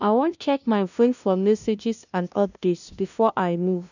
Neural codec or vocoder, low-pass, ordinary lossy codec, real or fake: codec, 16 kHz, 0.5 kbps, FunCodec, trained on LibriTTS, 25 frames a second; 7.2 kHz; none; fake